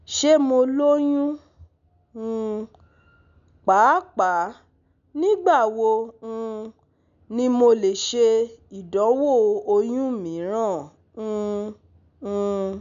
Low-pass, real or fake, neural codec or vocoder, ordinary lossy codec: 7.2 kHz; real; none; none